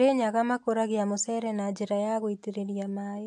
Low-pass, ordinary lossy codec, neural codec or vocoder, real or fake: 10.8 kHz; AAC, 64 kbps; none; real